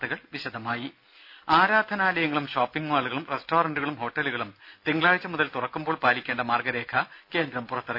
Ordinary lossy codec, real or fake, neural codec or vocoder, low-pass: none; real; none; 5.4 kHz